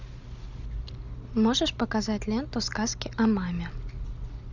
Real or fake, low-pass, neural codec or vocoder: real; 7.2 kHz; none